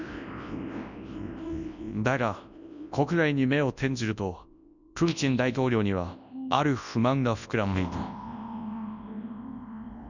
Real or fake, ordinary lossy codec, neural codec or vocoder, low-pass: fake; none; codec, 24 kHz, 0.9 kbps, WavTokenizer, large speech release; 7.2 kHz